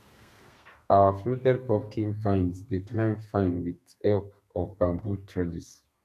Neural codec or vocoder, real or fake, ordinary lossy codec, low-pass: codec, 32 kHz, 1.9 kbps, SNAC; fake; none; 14.4 kHz